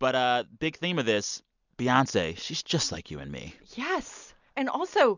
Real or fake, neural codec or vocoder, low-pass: real; none; 7.2 kHz